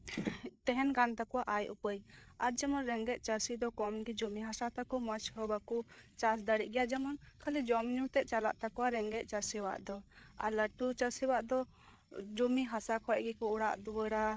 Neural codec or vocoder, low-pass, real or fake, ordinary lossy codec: codec, 16 kHz, 8 kbps, FreqCodec, larger model; none; fake; none